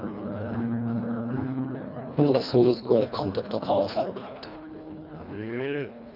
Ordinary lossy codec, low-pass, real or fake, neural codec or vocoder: none; 5.4 kHz; fake; codec, 24 kHz, 1.5 kbps, HILCodec